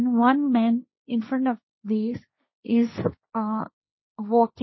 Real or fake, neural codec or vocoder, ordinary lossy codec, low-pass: fake; codec, 16 kHz, 1.1 kbps, Voila-Tokenizer; MP3, 24 kbps; 7.2 kHz